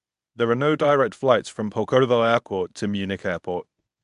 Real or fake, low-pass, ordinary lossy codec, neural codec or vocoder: fake; 10.8 kHz; none; codec, 24 kHz, 0.9 kbps, WavTokenizer, medium speech release version 1